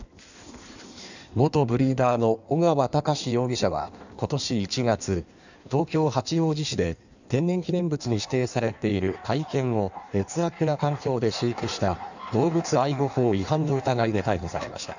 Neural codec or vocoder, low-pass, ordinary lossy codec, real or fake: codec, 16 kHz in and 24 kHz out, 1.1 kbps, FireRedTTS-2 codec; 7.2 kHz; none; fake